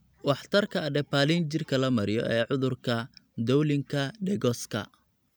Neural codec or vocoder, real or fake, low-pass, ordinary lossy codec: none; real; none; none